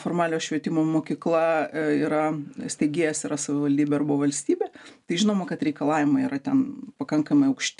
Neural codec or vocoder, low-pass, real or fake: none; 10.8 kHz; real